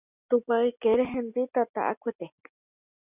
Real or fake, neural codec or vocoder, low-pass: real; none; 3.6 kHz